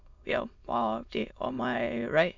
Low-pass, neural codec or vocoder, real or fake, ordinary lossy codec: 7.2 kHz; autoencoder, 22.05 kHz, a latent of 192 numbers a frame, VITS, trained on many speakers; fake; none